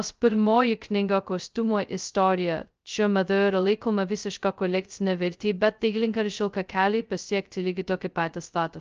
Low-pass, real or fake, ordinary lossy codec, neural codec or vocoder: 7.2 kHz; fake; Opus, 24 kbps; codec, 16 kHz, 0.2 kbps, FocalCodec